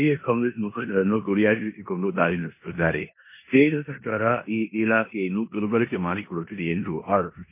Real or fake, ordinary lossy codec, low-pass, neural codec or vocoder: fake; MP3, 24 kbps; 3.6 kHz; codec, 16 kHz in and 24 kHz out, 0.9 kbps, LongCat-Audio-Codec, four codebook decoder